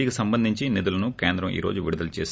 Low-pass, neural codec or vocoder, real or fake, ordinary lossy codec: none; none; real; none